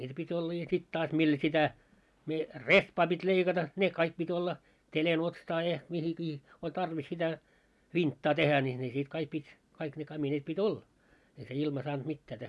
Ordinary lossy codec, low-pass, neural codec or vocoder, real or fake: none; none; none; real